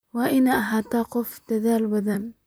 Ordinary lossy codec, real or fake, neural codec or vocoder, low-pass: none; fake; vocoder, 44.1 kHz, 128 mel bands, Pupu-Vocoder; none